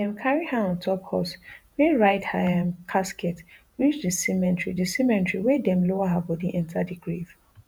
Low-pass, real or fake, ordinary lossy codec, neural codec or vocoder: none; fake; none; vocoder, 48 kHz, 128 mel bands, Vocos